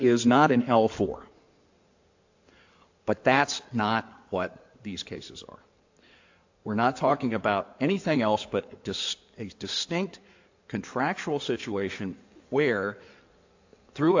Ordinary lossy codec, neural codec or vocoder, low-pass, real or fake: AAC, 48 kbps; codec, 16 kHz in and 24 kHz out, 2.2 kbps, FireRedTTS-2 codec; 7.2 kHz; fake